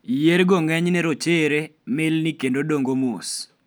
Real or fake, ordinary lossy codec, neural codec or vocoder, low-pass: real; none; none; none